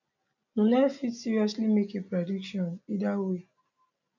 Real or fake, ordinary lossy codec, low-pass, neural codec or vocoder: real; none; 7.2 kHz; none